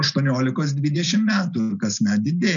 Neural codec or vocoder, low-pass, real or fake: none; 7.2 kHz; real